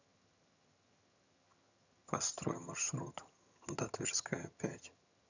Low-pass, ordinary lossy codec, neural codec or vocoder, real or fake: 7.2 kHz; none; vocoder, 22.05 kHz, 80 mel bands, HiFi-GAN; fake